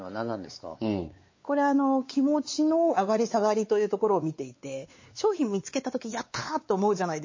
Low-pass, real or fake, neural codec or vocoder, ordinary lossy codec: 7.2 kHz; fake; codec, 16 kHz, 4 kbps, X-Codec, WavLM features, trained on Multilingual LibriSpeech; MP3, 32 kbps